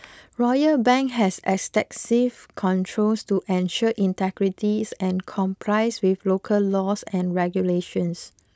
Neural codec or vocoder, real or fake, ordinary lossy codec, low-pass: none; real; none; none